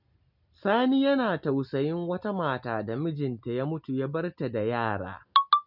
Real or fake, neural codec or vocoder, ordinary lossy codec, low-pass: real; none; none; 5.4 kHz